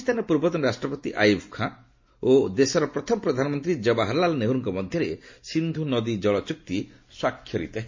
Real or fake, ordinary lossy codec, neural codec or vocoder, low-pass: real; MP3, 32 kbps; none; 7.2 kHz